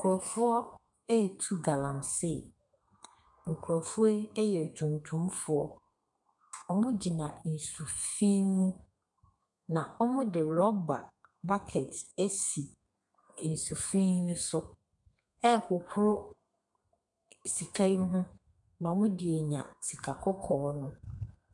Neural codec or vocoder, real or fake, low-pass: codec, 32 kHz, 1.9 kbps, SNAC; fake; 10.8 kHz